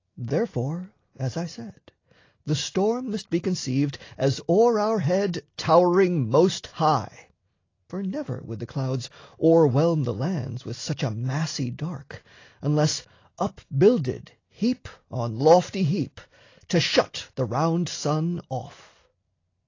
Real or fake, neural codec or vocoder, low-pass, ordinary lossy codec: real; none; 7.2 kHz; AAC, 32 kbps